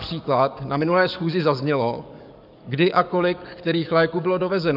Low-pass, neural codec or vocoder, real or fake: 5.4 kHz; codec, 44.1 kHz, 7.8 kbps, DAC; fake